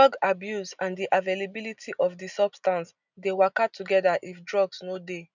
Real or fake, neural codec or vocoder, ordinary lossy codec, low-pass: real; none; none; 7.2 kHz